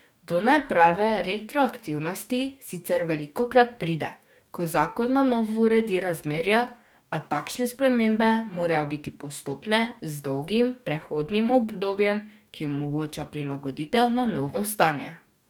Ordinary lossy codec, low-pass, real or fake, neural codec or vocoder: none; none; fake; codec, 44.1 kHz, 2.6 kbps, DAC